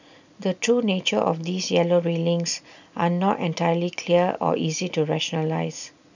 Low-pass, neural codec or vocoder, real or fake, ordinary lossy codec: 7.2 kHz; none; real; none